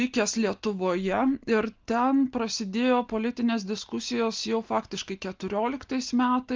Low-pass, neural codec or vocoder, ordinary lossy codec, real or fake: 7.2 kHz; none; Opus, 32 kbps; real